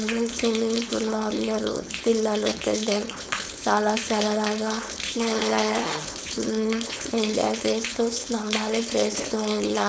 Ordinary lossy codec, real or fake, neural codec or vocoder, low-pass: none; fake; codec, 16 kHz, 4.8 kbps, FACodec; none